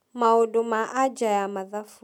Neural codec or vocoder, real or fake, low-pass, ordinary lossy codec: none; real; 19.8 kHz; none